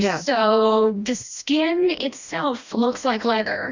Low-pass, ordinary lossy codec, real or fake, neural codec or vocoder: 7.2 kHz; Opus, 64 kbps; fake; codec, 16 kHz, 1 kbps, FreqCodec, smaller model